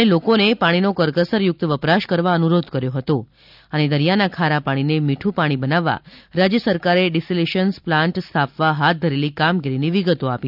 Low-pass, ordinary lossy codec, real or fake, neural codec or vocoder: 5.4 kHz; none; real; none